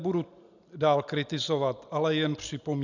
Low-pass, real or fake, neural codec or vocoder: 7.2 kHz; real; none